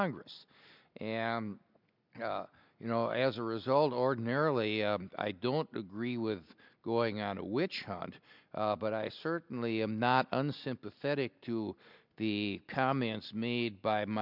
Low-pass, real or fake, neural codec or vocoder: 5.4 kHz; real; none